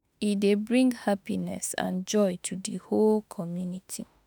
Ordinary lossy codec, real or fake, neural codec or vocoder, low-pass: none; fake; autoencoder, 48 kHz, 32 numbers a frame, DAC-VAE, trained on Japanese speech; none